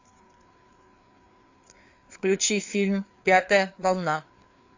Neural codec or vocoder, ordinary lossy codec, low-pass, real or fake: codec, 16 kHz, 4 kbps, FreqCodec, larger model; AAC, 48 kbps; 7.2 kHz; fake